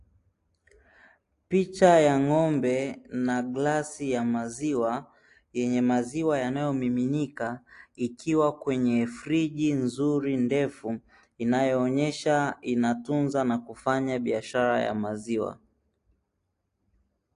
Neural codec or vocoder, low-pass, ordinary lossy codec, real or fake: none; 10.8 kHz; AAC, 48 kbps; real